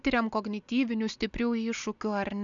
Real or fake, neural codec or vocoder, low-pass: real; none; 7.2 kHz